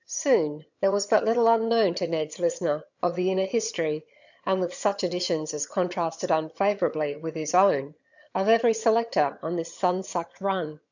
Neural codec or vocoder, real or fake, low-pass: vocoder, 22.05 kHz, 80 mel bands, HiFi-GAN; fake; 7.2 kHz